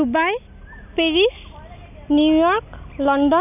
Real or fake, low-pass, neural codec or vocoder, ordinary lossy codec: real; 3.6 kHz; none; none